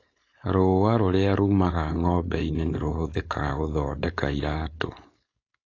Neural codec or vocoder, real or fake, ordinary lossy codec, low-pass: codec, 16 kHz, 4.8 kbps, FACodec; fake; AAC, 32 kbps; 7.2 kHz